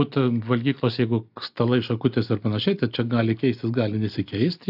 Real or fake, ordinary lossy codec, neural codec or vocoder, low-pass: real; AAC, 48 kbps; none; 5.4 kHz